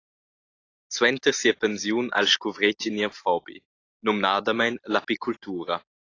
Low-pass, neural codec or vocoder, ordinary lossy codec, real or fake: 7.2 kHz; none; AAC, 32 kbps; real